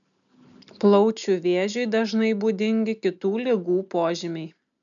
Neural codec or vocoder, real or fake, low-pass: none; real; 7.2 kHz